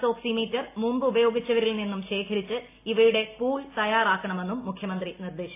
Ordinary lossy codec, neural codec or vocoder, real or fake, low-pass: AAC, 24 kbps; none; real; 3.6 kHz